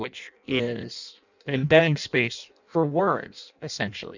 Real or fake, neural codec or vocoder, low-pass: fake; codec, 16 kHz in and 24 kHz out, 0.6 kbps, FireRedTTS-2 codec; 7.2 kHz